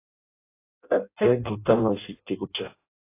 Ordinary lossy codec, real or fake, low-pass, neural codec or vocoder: AAC, 24 kbps; fake; 3.6 kHz; codec, 16 kHz in and 24 kHz out, 0.6 kbps, FireRedTTS-2 codec